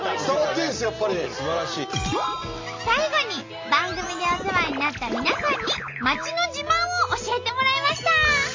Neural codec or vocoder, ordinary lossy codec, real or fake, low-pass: none; MP3, 48 kbps; real; 7.2 kHz